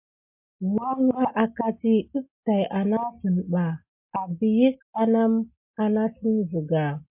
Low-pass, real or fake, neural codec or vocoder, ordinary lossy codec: 3.6 kHz; real; none; MP3, 32 kbps